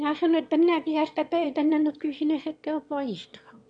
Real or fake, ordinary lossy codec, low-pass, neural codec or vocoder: fake; AAC, 64 kbps; 9.9 kHz; autoencoder, 22.05 kHz, a latent of 192 numbers a frame, VITS, trained on one speaker